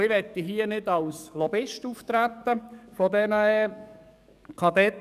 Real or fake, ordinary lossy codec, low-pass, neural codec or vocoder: fake; none; 14.4 kHz; codec, 44.1 kHz, 7.8 kbps, DAC